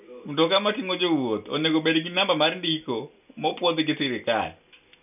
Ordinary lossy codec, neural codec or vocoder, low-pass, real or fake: none; none; 3.6 kHz; real